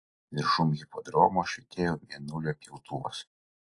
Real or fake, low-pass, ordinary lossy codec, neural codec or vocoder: real; 10.8 kHz; AAC, 48 kbps; none